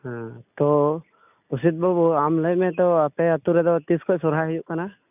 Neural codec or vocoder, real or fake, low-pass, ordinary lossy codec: none; real; 3.6 kHz; none